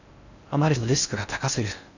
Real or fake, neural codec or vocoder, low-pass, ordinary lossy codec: fake; codec, 16 kHz in and 24 kHz out, 0.6 kbps, FocalCodec, streaming, 4096 codes; 7.2 kHz; none